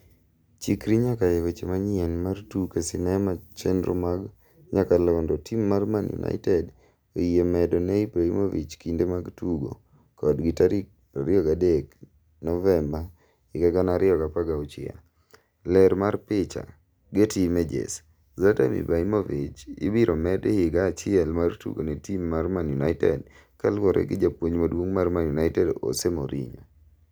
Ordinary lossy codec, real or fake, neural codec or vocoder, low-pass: none; real; none; none